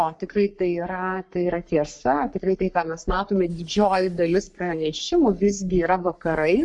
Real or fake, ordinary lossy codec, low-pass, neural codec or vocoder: fake; MP3, 96 kbps; 10.8 kHz; codec, 44.1 kHz, 3.4 kbps, Pupu-Codec